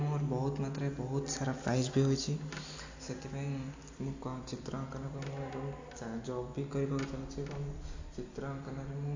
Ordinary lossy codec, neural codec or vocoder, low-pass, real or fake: AAC, 48 kbps; none; 7.2 kHz; real